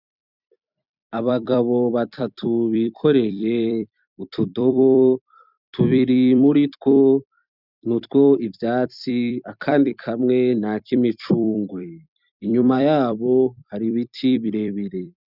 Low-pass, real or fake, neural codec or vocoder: 5.4 kHz; fake; vocoder, 44.1 kHz, 128 mel bands every 256 samples, BigVGAN v2